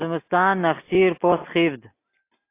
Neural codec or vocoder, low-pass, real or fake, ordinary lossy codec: none; 3.6 kHz; real; AAC, 24 kbps